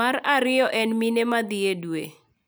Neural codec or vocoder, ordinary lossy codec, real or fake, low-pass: none; none; real; none